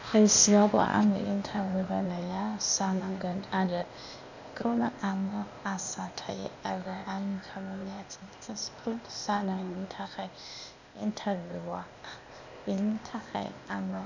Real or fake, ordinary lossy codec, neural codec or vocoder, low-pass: fake; none; codec, 16 kHz, 0.8 kbps, ZipCodec; 7.2 kHz